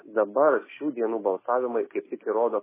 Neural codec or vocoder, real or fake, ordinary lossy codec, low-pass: none; real; MP3, 16 kbps; 3.6 kHz